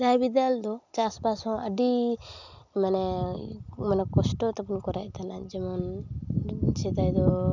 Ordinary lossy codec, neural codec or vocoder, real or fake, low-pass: none; none; real; 7.2 kHz